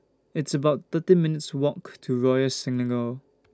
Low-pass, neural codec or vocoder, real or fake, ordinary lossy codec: none; none; real; none